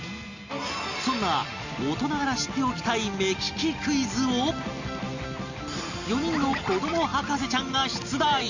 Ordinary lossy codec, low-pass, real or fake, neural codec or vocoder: Opus, 64 kbps; 7.2 kHz; real; none